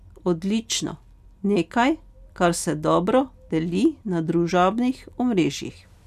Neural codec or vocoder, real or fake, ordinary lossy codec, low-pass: none; real; none; 14.4 kHz